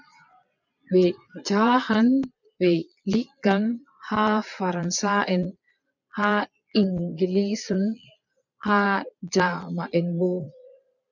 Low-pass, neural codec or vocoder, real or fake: 7.2 kHz; vocoder, 22.05 kHz, 80 mel bands, Vocos; fake